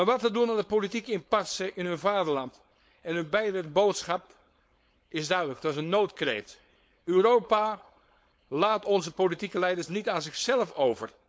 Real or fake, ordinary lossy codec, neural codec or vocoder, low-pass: fake; none; codec, 16 kHz, 4.8 kbps, FACodec; none